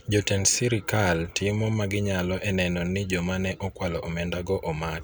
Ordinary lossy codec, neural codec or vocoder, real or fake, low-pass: none; none; real; none